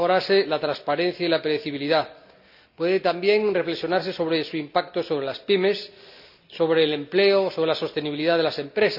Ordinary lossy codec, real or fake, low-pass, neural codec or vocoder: none; real; 5.4 kHz; none